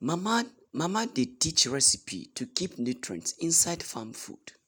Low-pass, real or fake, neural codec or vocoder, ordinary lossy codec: none; real; none; none